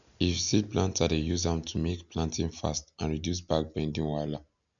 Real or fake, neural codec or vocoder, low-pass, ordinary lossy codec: real; none; 7.2 kHz; none